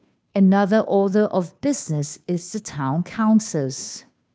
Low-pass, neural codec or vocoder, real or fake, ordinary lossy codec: none; codec, 16 kHz, 2 kbps, FunCodec, trained on Chinese and English, 25 frames a second; fake; none